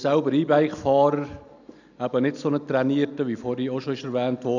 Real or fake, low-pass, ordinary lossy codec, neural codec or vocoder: real; 7.2 kHz; none; none